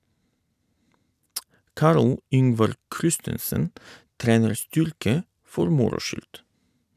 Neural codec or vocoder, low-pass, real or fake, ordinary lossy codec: none; 14.4 kHz; real; none